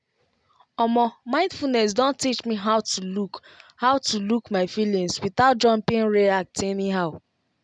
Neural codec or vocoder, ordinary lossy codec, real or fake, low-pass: none; none; real; 9.9 kHz